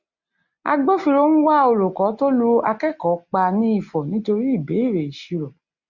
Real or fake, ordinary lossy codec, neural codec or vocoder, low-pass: real; Opus, 64 kbps; none; 7.2 kHz